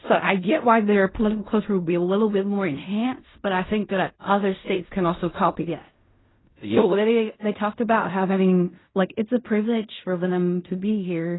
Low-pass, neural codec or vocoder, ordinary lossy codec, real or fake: 7.2 kHz; codec, 16 kHz in and 24 kHz out, 0.4 kbps, LongCat-Audio-Codec, fine tuned four codebook decoder; AAC, 16 kbps; fake